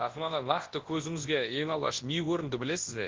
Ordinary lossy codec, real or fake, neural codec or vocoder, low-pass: Opus, 16 kbps; fake; codec, 24 kHz, 0.9 kbps, WavTokenizer, large speech release; 7.2 kHz